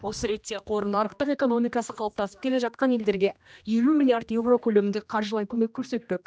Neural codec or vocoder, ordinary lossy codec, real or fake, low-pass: codec, 16 kHz, 1 kbps, X-Codec, HuBERT features, trained on general audio; none; fake; none